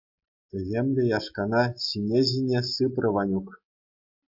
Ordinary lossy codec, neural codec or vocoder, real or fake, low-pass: AAC, 48 kbps; none; real; 5.4 kHz